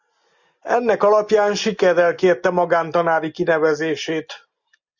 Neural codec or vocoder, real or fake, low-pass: none; real; 7.2 kHz